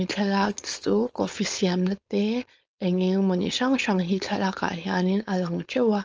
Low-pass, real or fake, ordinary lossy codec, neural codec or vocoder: 7.2 kHz; fake; Opus, 24 kbps; codec, 16 kHz, 4.8 kbps, FACodec